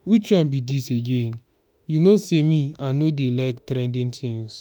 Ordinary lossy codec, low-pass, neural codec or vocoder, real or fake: none; none; autoencoder, 48 kHz, 32 numbers a frame, DAC-VAE, trained on Japanese speech; fake